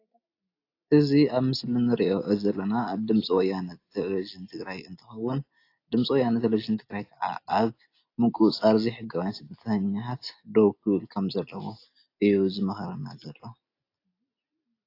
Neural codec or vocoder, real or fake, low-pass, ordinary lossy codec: none; real; 5.4 kHz; AAC, 32 kbps